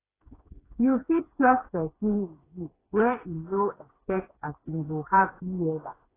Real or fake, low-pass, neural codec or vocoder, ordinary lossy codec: fake; 3.6 kHz; codec, 16 kHz, 4 kbps, FreqCodec, smaller model; AAC, 16 kbps